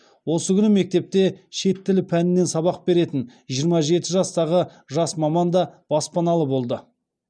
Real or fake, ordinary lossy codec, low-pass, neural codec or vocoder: real; none; 9.9 kHz; none